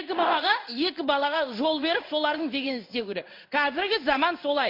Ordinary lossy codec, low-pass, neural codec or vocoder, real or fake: MP3, 32 kbps; 5.4 kHz; codec, 16 kHz in and 24 kHz out, 1 kbps, XY-Tokenizer; fake